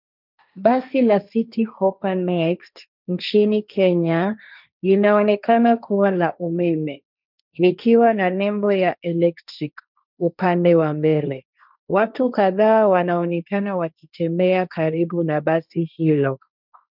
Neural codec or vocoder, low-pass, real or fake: codec, 16 kHz, 1.1 kbps, Voila-Tokenizer; 5.4 kHz; fake